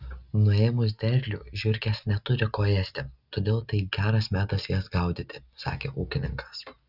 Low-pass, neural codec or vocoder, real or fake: 5.4 kHz; none; real